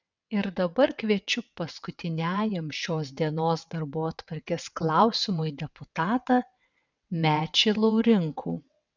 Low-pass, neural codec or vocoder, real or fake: 7.2 kHz; vocoder, 22.05 kHz, 80 mel bands, WaveNeXt; fake